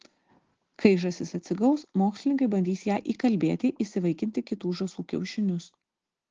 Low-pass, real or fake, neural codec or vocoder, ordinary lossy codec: 7.2 kHz; real; none; Opus, 16 kbps